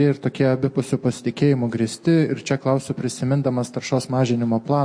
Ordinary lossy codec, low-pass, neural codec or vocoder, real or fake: MP3, 48 kbps; 9.9 kHz; none; real